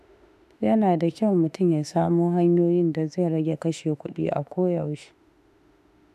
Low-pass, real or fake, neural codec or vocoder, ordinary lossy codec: 14.4 kHz; fake; autoencoder, 48 kHz, 32 numbers a frame, DAC-VAE, trained on Japanese speech; none